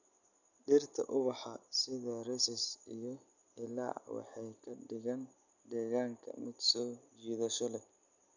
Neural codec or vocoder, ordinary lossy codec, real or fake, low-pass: none; none; real; 7.2 kHz